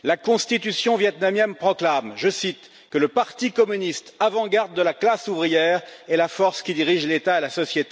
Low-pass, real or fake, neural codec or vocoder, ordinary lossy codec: none; real; none; none